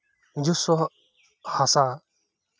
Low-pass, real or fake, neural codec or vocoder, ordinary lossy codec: none; real; none; none